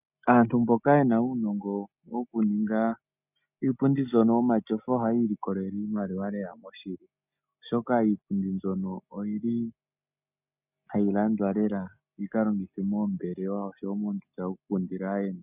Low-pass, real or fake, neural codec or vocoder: 3.6 kHz; real; none